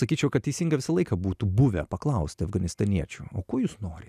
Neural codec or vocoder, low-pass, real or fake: none; 14.4 kHz; real